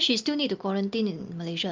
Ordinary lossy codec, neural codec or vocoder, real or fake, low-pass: Opus, 32 kbps; none; real; 7.2 kHz